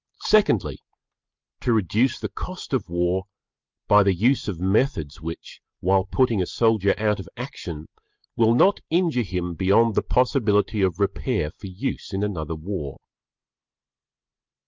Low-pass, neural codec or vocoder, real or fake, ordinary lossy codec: 7.2 kHz; none; real; Opus, 24 kbps